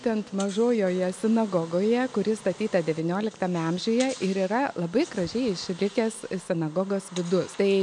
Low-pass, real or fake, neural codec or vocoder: 10.8 kHz; real; none